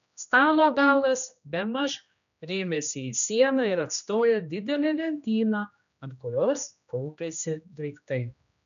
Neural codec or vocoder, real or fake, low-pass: codec, 16 kHz, 1 kbps, X-Codec, HuBERT features, trained on general audio; fake; 7.2 kHz